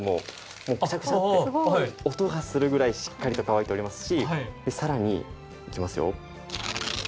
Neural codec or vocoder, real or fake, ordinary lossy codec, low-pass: none; real; none; none